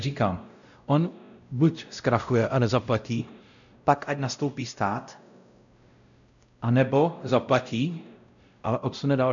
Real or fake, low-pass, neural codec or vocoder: fake; 7.2 kHz; codec, 16 kHz, 0.5 kbps, X-Codec, WavLM features, trained on Multilingual LibriSpeech